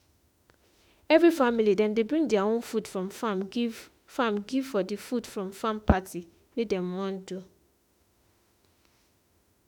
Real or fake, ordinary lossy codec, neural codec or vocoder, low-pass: fake; none; autoencoder, 48 kHz, 32 numbers a frame, DAC-VAE, trained on Japanese speech; none